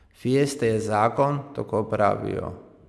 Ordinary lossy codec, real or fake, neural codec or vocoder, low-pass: none; real; none; none